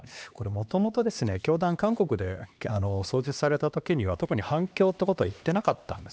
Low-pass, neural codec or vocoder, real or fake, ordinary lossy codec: none; codec, 16 kHz, 2 kbps, X-Codec, HuBERT features, trained on LibriSpeech; fake; none